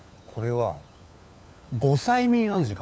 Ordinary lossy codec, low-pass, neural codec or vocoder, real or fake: none; none; codec, 16 kHz, 4 kbps, FunCodec, trained on LibriTTS, 50 frames a second; fake